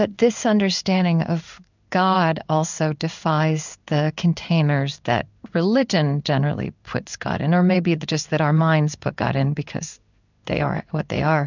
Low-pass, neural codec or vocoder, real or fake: 7.2 kHz; codec, 16 kHz in and 24 kHz out, 1 kbps, XY-Tokenizer; fake